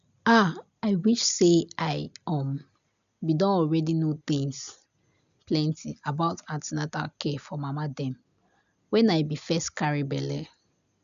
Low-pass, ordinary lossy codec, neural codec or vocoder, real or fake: 7.2 kHz; MP3, 96 kbps; none; real